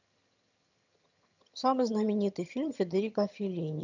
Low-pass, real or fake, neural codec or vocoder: 7.2 kHz; fake; vocoder, 22.05 kHz, 80 mel bands, HiFi-GAN